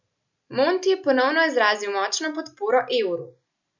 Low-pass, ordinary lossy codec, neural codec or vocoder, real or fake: 7.2 kHz; none; none; real